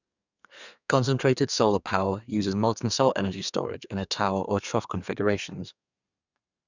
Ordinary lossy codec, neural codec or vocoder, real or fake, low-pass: none; codec, 32 kHz, 1.9 kbps, SNAC; fake; 7.2 kHz